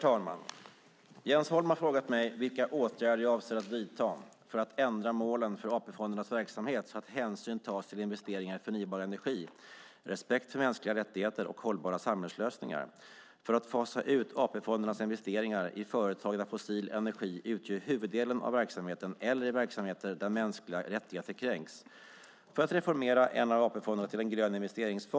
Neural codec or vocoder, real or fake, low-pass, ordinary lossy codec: none; real; none; none